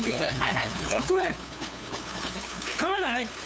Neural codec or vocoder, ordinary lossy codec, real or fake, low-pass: codec, 16 kHz, 2 kbps, FunCodec, trained on LibriTTS, 25 frames a second; none; fake; none